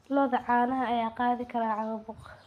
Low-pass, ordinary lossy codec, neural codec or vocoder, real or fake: 14.4 kHz; none; none; real